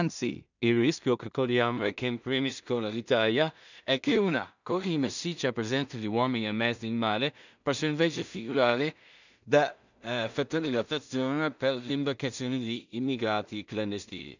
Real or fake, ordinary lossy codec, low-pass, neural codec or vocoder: fake; none; 7.2 kHz; codec, 16 kHz in and 24 kHz out, 0.4 kbps, LongCat-Audio-Codec, two codebook decoder